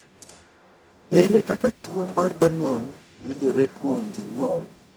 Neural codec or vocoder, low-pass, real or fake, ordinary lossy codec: codec, 44.1 kHz, 0.9 kbps, DAC; none; fake; none